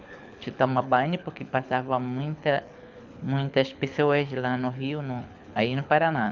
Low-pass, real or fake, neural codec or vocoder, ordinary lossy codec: 7.2 kHz; fake; codec, 24 kHz, 6 kbps, HILCodec; none